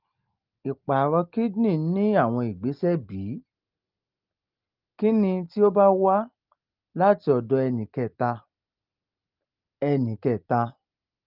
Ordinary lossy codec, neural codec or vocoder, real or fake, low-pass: Opus, 32 kbps; none; real; 5.4 kHz